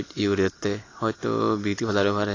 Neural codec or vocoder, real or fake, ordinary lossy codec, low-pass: none; real; AAC, 32 kbps; 7.2 kHz